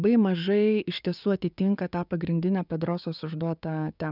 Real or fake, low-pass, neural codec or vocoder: fake; 5.4 kHz; codec, 16 kHz, 6 kbps, DAC